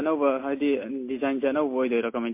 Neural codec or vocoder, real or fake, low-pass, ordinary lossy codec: none; real; 3.6 kHz; MP3, 24 kbps